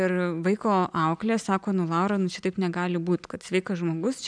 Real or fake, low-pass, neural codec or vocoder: real; 9.9 kHz; none